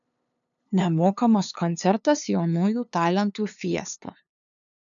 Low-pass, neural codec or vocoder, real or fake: 7.2 kHz; codec, 16 kHz, 2 kbps, FunCodec, trained on LibriTTS, 25 frames a second; fake